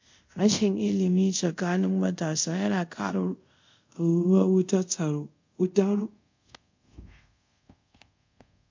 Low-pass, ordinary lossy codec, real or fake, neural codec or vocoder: 7.2 kHz; MP3, 48 kbps; fake; codec, 24 kHz, 0.5 kbps, DualCodec